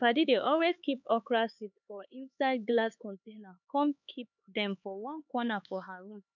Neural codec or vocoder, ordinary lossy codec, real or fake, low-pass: codec, 16 kHz, 4 kbps, X-Codec, HuBERT features, trained on LibriSpeech; none; fake; 7.2 kHz